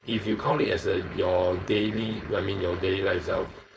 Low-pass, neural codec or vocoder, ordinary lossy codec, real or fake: none; codec, 16 kHz, 4.8 kbps, FACodec; none; fake